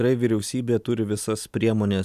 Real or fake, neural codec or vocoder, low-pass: real; none; 14.4 kHz